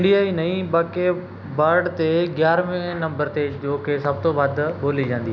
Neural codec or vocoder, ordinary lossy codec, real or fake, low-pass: none; none; real; none